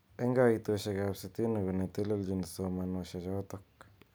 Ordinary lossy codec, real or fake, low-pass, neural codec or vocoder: none; real; none; none